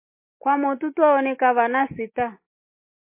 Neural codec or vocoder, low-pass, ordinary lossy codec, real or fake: none; 3.6 kHz; MP3, 24 kbps; real